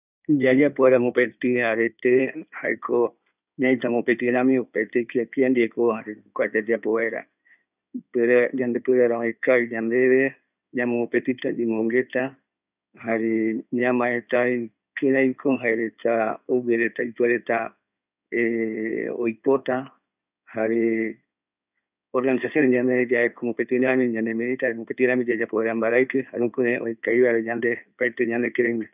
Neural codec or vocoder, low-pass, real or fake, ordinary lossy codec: codec, 16 kHz in and 24 kHz out, 2.2 kbps, FireRedTTS-2 codec; 3.6 kHz; fake; none